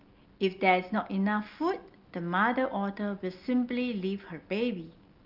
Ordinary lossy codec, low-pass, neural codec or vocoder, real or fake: Opus, 24 kbps; 5.4 kHz; none; real